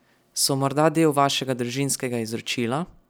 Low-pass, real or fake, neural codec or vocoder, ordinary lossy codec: none; real; none; none